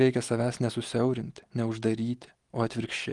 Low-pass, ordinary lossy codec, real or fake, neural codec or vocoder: 10.8 kHz; Opus, 24 kbps; real; none